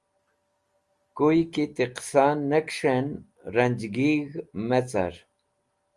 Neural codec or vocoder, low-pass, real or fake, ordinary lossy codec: none; 10.8 kHz; real; Opus, 32 kbps